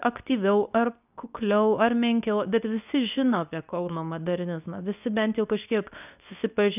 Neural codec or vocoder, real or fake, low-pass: codec, 24 kHz, 0.9 kbps, WavTokenizer, medium speech release version 2; fake; 3.6 kHz